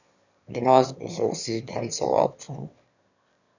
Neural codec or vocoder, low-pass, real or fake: autoencoder, 22.05 kHz, a latent of 192 numbers a frame, VITS, trained on one speaker; 7.2 kHz; fake